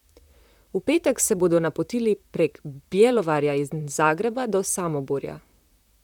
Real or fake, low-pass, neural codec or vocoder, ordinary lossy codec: fake; 19.8 kHz; vocoder, 44.1 kHz, 128 mel bands, Pupu-Vocoder; none